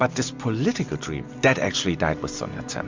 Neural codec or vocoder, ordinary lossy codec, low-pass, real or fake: none; MP3, 64 kbps; 7.2 kHz; real